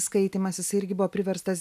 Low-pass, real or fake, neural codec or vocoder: 14.4 kHz; real; none